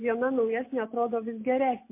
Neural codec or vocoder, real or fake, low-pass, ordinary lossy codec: none; real; 3.6 kHz; MP3, 24 kbps